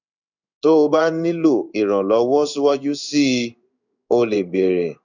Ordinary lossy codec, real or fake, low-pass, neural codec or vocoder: none; fake; 7.2 kHz; codec, 16 kHz in and 24 kHz out, 1 kbps, XY-Tokenizer